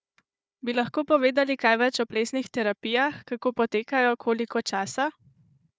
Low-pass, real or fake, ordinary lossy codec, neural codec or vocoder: none; fake; none; codec, 16 kHz, 4 kbps, FunCodec, trained on Chinese and English, 50 frames a second